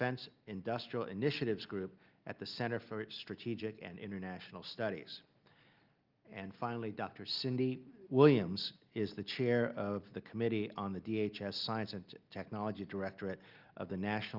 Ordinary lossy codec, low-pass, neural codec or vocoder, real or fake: Opus, 32 kbps; 5.4 kHz; none; real